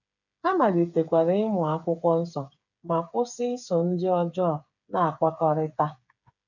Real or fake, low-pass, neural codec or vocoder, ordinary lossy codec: fake; 7.2 kHz; codec, 16 kHz, 8 kbps, FreqCodec, smaller model; none